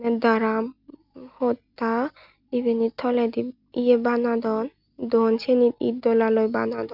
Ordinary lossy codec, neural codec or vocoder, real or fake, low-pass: none; none; real; 5.4 kHz